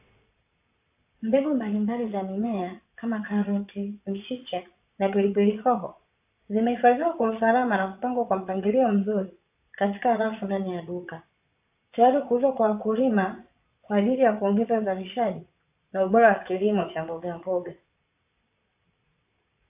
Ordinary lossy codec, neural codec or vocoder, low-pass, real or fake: AAC, 32 kbps; vocoder, 22.05 kHz, 80 mel bands, Vocos; 3.6 kHz; fake